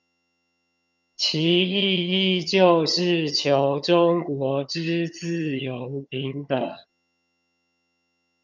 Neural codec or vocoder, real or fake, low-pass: vocoder, 22.05 kHz, 80 mel bands, HiFi-GAN; fake; 7.2 kHz